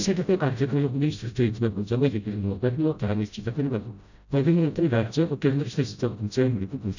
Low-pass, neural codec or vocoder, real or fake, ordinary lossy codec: 7.2 kHz; codec, 16 kHz, 0.5 kbps, FreqCodec, smaller model; fake; none